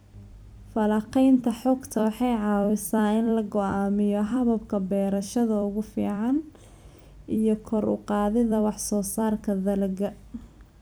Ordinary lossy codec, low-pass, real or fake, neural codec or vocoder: none; none; fake; vocoder, 44.1 kHz, 128 mel bands every 256 samples, BigVGAN v2